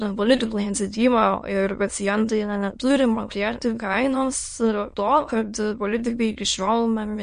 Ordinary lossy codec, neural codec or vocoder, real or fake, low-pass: MP3, 48 kbps; autoencoder, 22.05 kHz, a latent of 192 numbers a frame, VITS, trained on many speakers; fake; 9.9 kHz